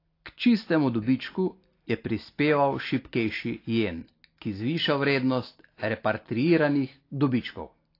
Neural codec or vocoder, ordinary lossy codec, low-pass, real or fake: none; AAC, 32 kbps; 5.4 kHz; real